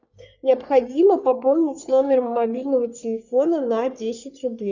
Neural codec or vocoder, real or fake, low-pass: codec, 44.1 kHz, 3.4 kbps, Pupu-Codec; fake; 7.2 kHz